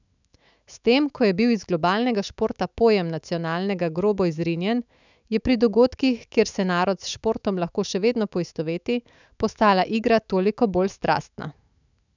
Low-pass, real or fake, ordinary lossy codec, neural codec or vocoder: 7.2 kHz; fake; none; autoencoder, 48 kHz, 128 numbers a frame, DAC-VAE, trained on Japanese speech